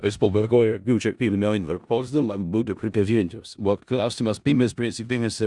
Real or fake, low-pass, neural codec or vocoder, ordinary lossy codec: fake; 10.8 kHz; codec, 16 kHz in and 24 kHz out, 0.4 kbps, LongCat-Audio-Codec, four codebook decoder; Opus, 64 kbps